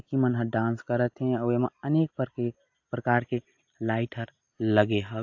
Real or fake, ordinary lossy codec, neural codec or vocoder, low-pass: real; AAC, 48 kbps; none; 7.2 kHz